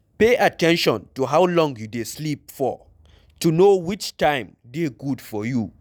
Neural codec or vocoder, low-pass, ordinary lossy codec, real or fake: none; 19.8 kHz; none; real